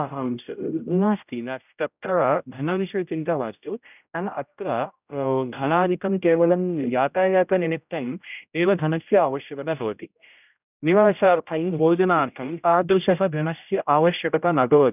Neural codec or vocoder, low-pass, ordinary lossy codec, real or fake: codec, 16 kHz, 0.5 kbps, X-Codec, HuBERT features, trained on general audio; 3.6 kHz; none; fake